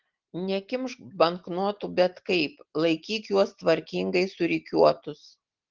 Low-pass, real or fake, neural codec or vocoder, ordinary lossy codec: 7.2 kHz; real; none; Opus, 16 kbps